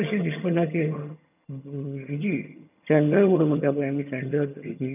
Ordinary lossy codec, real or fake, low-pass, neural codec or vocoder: none; fake; 3.6 kHz; vocoder, 22.05 kHz, 80 mel bands, HiFi-GAN